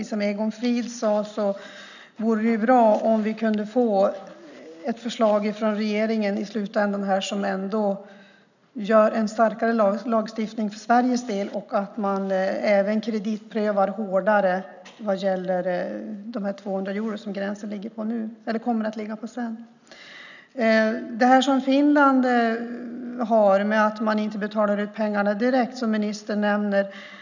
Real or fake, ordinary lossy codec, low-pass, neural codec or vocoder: real; none; 7.2 kHz; none